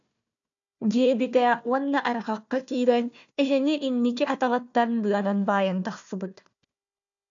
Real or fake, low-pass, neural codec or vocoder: fake; 7.2 kHz; codec, 16 kHz, 1 kbps, FunCodec, trained on Chinese and English, 50 frames a second